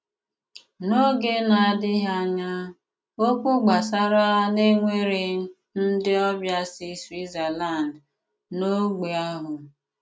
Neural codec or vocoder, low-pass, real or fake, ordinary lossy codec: none; none; real; none